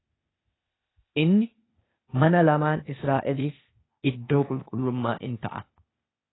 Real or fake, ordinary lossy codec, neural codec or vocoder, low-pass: fake; AAC, 16 kbps; codec, 16 kHz, 0.8 kbps, ZipCodec; 7.2 kHz